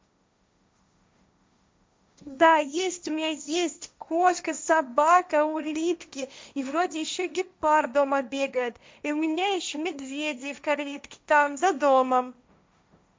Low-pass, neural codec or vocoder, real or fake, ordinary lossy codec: none; codec, 16 kHz, 1.1 kbps, Voila-Tokenizer; fake; none